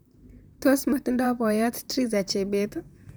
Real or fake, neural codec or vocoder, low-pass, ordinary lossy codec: fake; vocoder, 44.1 kHz, 128 mel bands, Pupu-Vocoder; none; none